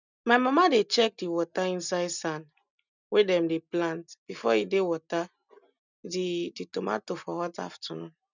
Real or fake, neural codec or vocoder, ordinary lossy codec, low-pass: real; none; none; 7.2 kHz